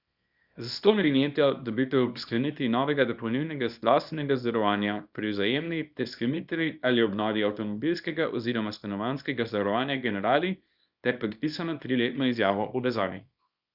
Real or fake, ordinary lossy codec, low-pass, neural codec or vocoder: fake; Opus, 64 kbps; 5.4 kHz; codec, 24 kHz, 0.9 kbps, WavTokenizer, small release